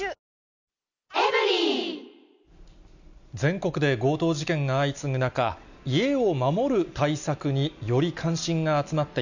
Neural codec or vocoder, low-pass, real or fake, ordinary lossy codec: none; 7.2 kHz; real; none